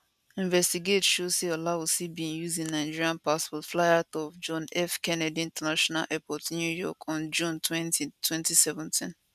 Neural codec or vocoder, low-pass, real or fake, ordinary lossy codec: none; 14.4 kHz; real; none